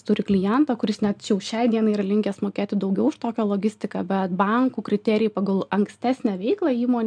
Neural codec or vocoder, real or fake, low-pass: none; real; 9.9 kHz